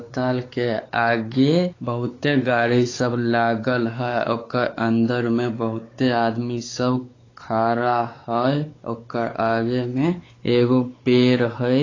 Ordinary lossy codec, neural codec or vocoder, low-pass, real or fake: AAC, 32 kbps; codec, 16 kHz, 4 kbps, X-Codec, WavLM features, trained on Multilingual LibriSpeech; 7.2 kHz; fake